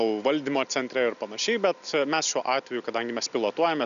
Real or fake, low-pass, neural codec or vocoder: real; 7.2 kHz; none